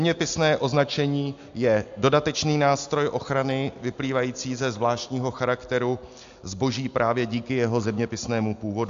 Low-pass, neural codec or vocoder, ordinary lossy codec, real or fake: 7.2 kHz; none; AAC, 64 kbps; real